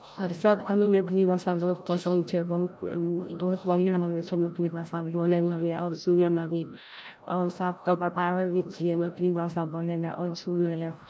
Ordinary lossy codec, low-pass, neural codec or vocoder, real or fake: none; none; codec, 16 kHz, 0.5 kbps, FreqCodec, larger model; fake